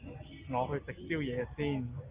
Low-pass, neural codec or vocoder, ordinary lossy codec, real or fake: 3.6 kHz; none; Opus, 24 kbps; real